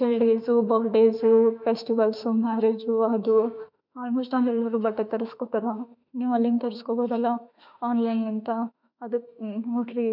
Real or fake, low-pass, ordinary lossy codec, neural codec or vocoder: fake; 5.4 kHz; none; autoencoder, 48 kHz, 32 numbers a frame, DAC-VAE, trained on Japanese speech